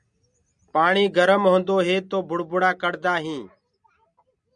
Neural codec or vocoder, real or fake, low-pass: none; real; 9.9 kHz